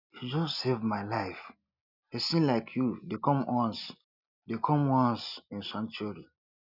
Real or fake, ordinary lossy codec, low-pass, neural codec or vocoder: real; none; 5.4 kHz; none